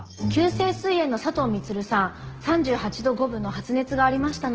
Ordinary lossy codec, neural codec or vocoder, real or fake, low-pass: Opus, 16 kbps; none; real; 7.2 kHz